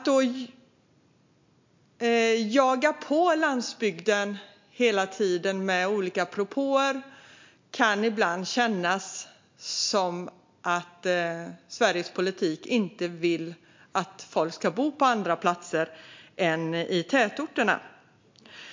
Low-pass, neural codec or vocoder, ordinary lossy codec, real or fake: 7.2 kHz; none; MP3, 64 kbps; real